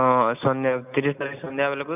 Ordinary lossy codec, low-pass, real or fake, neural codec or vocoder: none; 3.6 kHz; real; none